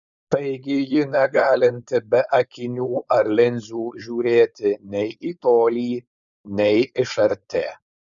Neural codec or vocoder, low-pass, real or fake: codec, 16 kHz, 4.8 kbps, FACodec; 7.2 kHz; fake